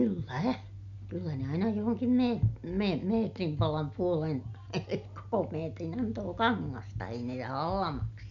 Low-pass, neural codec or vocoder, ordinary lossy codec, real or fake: 7.2 kHz; none; none; real